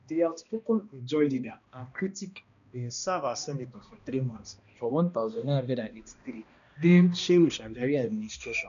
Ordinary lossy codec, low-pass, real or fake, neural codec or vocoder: none; 7.2 kHz; fake; codec, 16 kHz, 1 kbps, X-Codec, HuBERT features, trained on balanced general audio